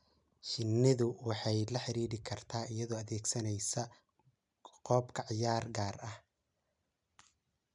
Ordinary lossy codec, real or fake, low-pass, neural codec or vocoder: none; real; 10.8 kHz; none